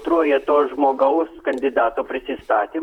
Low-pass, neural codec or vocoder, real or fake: 19.8 kHz; vocoder, 44.1 kHz, 128 mel bands, Pupu-Vocoder; fake